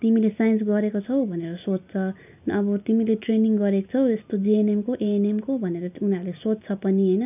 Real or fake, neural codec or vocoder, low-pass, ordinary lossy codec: real; none; 3.6 kHz; none